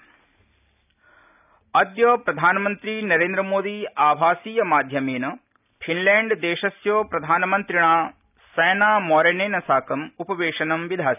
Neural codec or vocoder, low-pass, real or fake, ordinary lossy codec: none; 3.6 kHz; real; none